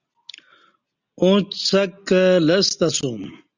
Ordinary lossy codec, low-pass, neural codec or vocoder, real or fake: Opus, 64 kbps; 7.2 kHz; none; real